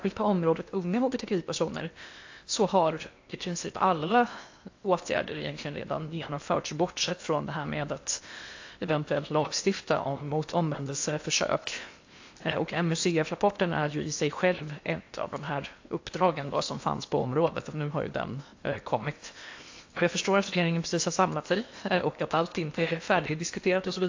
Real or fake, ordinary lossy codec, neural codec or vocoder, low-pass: fake; AAC, 48 kbps; codec, 16 kHz in and 24 kHz out, 0.8 kbps, FocalCodec, streaming, 65536 codes; 7.2 kHz